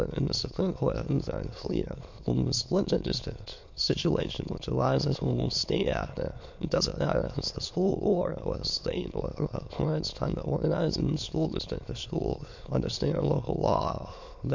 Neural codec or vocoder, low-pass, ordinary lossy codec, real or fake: autoencoder, 22.05 kHz, a latent of 192 numbers a frame, VITS, trained on many speakers; 7.2 kHz; MP3, 48 kbps; fake